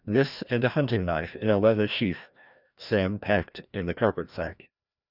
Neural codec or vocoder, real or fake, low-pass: codec, 16 kHz, 1 kbps, FreqCodec, larger model; fake; 5.4 kHz